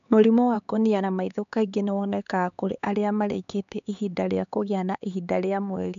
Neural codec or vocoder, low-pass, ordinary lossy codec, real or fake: codec, 16 kHz, 4 kbps, X-Codec, HuBERT features, trained on LibriSpeech; 7.2 kHz; MP3, 64 kbps; fake